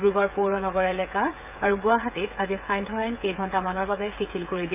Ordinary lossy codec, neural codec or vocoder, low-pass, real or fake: none; codec, 16 kHz, 8 kbps, FreqCodec, smaller model; 3.6 kHz; fake